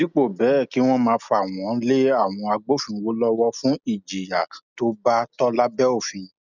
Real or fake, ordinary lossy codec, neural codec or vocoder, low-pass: real; none; none; 7.2 kHz